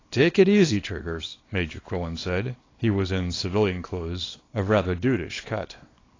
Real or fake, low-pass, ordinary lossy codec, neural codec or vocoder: fake; 7.2 kHz; AAC, 32 kbps; codec, 24 kHz, 0.9 kbps, WavTokenizer, small release